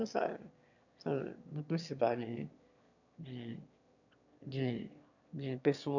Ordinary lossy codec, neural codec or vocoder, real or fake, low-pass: none; autoencoder, 22.05 kHz, a latent of 192 numbers a frame, VITS, trained on one speaker; fake; 7.2 kHz